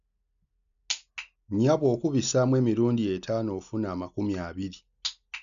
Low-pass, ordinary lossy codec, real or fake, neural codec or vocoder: 7.2 kHz; none; real; none